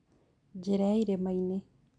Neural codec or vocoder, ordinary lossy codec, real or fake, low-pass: none; none; real; 9.9 kHz